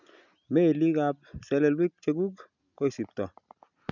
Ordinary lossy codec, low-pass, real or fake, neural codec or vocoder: none; 7.2 kHz; real; none